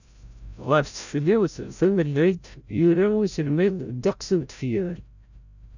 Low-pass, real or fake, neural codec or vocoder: 7.2 kHz; fake; codec, 16 kHz, 0.5 kbps, FreqCodec, larger model